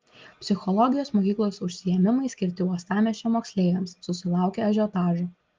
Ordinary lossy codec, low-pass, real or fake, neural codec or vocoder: Opus, 32 kbps; 7.2 kHz; real; none